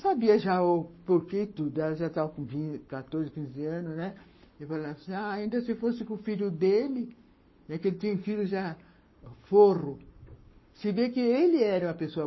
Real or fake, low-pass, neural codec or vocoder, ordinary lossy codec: fake; 7.2 kHz; codec, 44.1 kHz, 7.8 kbps, Pupu-Codec; MP3, 24 kbps